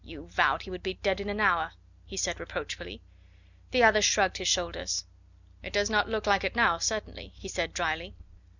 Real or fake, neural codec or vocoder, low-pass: real; none; 7.2 kHz